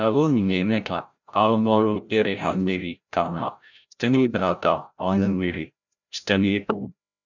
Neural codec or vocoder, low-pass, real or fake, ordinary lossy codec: codec, 16 kHz, 0.5 kbps, FreqCodec, larger model; 7.2 kHz; fake; none